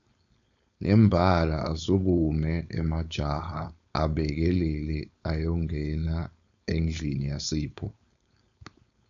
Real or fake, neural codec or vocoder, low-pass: fake; codec, 16 kHz, 4.8 kbps, FACodec; 7.2 kHz